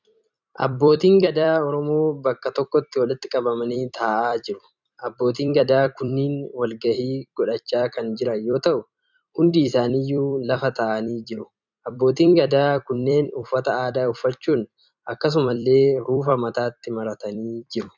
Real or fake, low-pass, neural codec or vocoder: fake; 7.2 kHz; vocoder, 44.1 kHz, 128 mel bands every 256 samples, BigVGAN v2